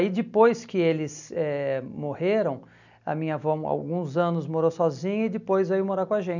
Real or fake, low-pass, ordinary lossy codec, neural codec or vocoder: real; 7.2 kHz; none; none